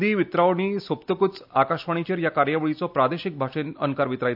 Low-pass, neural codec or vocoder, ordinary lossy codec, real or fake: 5.4 kHz; none; AAC, 48 kbps; real